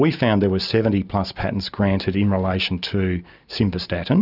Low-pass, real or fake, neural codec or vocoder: 5.4 kHz; real; none